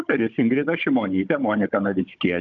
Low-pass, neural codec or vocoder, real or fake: 7.2 kHz; codec, 16 kHz, 16 kbps, FunCodec, trained on Chinese and English, 50 frames a second; fake